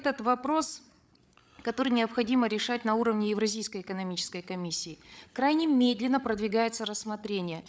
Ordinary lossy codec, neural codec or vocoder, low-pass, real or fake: none; codec, 16 kHz, 8 kbps, FreqCodec, larger model; none; fake